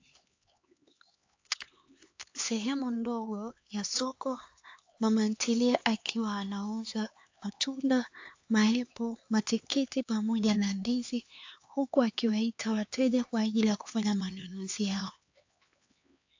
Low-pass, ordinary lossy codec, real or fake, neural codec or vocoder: 7.2 kHz; AAC, 48 kbps; fake; codec, 16 kHz, 4 kbps, X-Codec, HuBERT features, trained on LibriSpeech